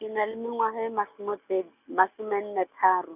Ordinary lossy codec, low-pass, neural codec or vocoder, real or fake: none; 3.6 kHz; none; real